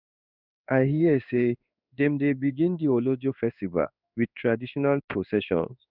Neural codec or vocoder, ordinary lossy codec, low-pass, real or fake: codec, 16 kHz in and 24 kHz out, 1 kbps, XY-Tokenizer; none; 5.4 kHz; fake